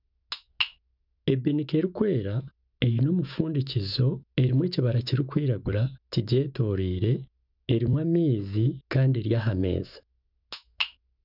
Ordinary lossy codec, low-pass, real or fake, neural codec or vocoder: none; 5.4 kHz; fake; autoencoder, 48 kHz, 128 numbers a frame, DAC-VAE, trained on Japanese speech